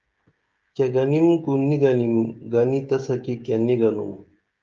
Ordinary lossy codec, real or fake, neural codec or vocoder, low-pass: Opus, 24 kbps; fake; codec, 16 kHz, 16 kbps, FreqCodec, smaller model; 7.2 kHz